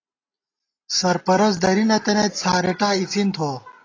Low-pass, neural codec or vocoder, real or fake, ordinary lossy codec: 7.2 kHz; none; real; AAC, 32 kbps